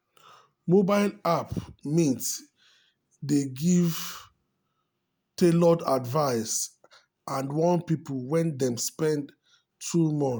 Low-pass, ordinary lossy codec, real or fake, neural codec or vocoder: none; none; real; none